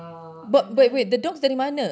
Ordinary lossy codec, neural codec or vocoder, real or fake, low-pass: none; none; real; none